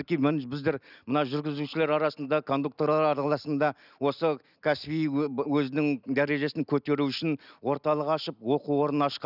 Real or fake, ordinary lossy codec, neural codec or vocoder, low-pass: real; none; none; 5.4 kHz